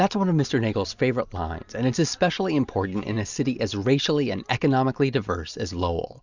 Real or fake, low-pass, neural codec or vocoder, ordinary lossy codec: real; 7.2 kHz; none; Opus, 64 kbps